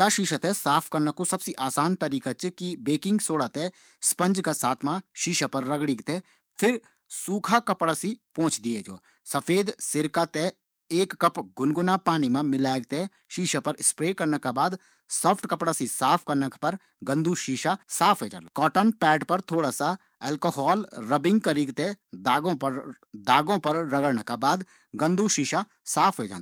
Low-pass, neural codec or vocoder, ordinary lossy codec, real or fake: none; codec, 44.1 kHz, 7.8 kbps, DAC; none; fake